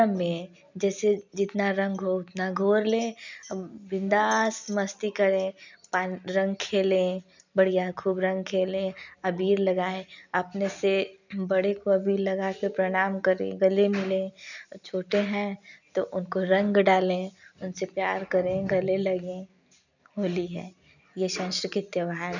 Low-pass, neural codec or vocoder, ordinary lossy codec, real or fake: 7.2 kHz; none; none; real